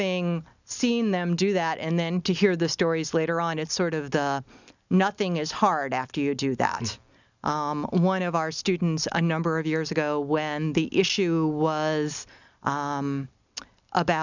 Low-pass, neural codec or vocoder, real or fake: 7.2 kHz; none; real